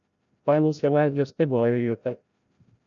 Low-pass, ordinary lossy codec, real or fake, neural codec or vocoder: 7.2 kHz; MP3, 96 kbps; fake; codec, 16 kHz, 0.5 kbps, FreqCodec, larger model